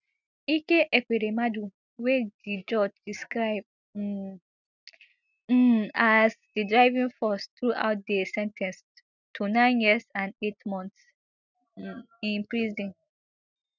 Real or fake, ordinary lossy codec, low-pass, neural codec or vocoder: real; none; 7.2 kHz; none